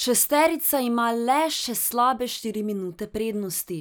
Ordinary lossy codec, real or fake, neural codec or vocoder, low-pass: none; real; none; none